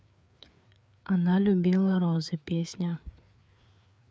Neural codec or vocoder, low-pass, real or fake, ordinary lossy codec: codec, 16 kHz, 4 kbps, FreqCodec, larger model; none; fake; none